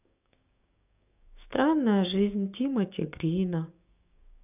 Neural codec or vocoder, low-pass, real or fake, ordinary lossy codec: codec, 16 kHz, 6 kbps, DAC; 3.6 kHz; fake; none